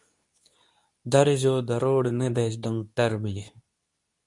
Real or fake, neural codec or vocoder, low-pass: fake; codec, 24 kHz, 0.9 kbps, WavTokenizer, medium speech release version 2; 10.8 kHz